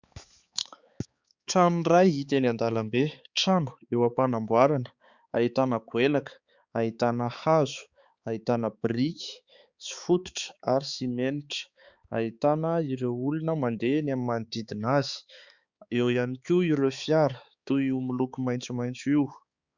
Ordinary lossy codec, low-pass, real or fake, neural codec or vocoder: Opus, 64 kbps; 7.2 kHz; fake; codec, 16 kHz, 4 kbps, X-Codec, HuBERT features, trained on balanced general audio